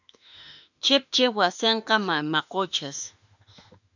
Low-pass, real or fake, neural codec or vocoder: 7.2 kHz; fake; autoencoder, 48 kHz, 32 numbers a frame, DAC-VAE, trained on Japanese speech